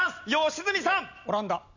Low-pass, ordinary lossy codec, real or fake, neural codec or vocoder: 7.2 kHz; none; real; none